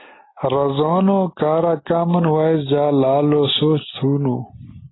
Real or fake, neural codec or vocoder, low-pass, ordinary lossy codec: real; none; 7.2 kHz; AAC, 16 kbps